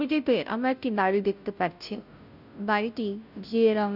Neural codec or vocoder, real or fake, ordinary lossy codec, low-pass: codec, 16 kHz, 0.5 kbps, FunCodec, trained on Chinese and English, 25 frames a second; fake; none; 5.4 kHz